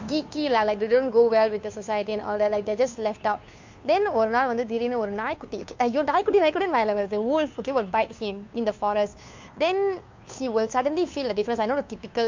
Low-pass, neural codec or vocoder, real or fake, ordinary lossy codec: 7.2 kHz; codec, 16 kHz, 2 kbps, FunCodec, trained on Chinese and English, 25 frames a second; fake; MP3, 48 kbps